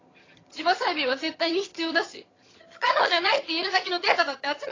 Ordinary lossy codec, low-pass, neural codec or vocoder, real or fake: AAC, 32 kbps; 7.2 kHz; vocoder, 22.05 kHz, 80 mel bands, HiFi-GAN; fake